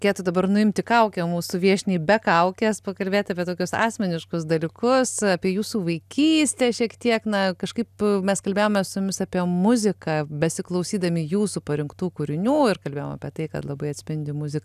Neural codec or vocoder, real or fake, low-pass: none; real; 14.4 kHz